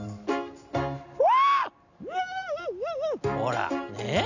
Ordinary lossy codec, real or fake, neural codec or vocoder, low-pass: none; real; none; 7.2 kHz